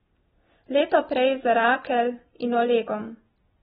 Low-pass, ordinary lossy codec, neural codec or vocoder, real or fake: 19.8 kHz; AAC, 16 kbps; none; real